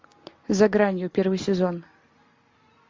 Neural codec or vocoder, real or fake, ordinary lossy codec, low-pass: none; real; MP3, 48 kbps; 7.2 kHz